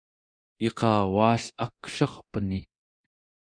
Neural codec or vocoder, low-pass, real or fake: codec, 24 kHz, 0.9 kbps, DualCodec; 9.9 kHz; fake